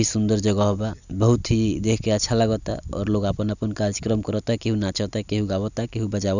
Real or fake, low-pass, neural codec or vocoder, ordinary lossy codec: real; 7.2 kHz; none; none